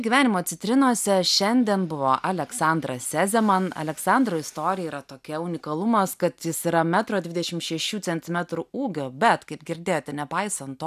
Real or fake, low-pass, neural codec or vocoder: real; 14.4 kHz; none